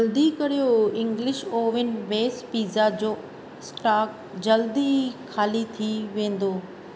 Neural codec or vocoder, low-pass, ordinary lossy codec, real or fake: none; none; none; real